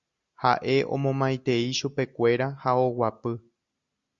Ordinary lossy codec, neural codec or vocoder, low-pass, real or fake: Opus, 64 kbps; none; 7.2 kHz; real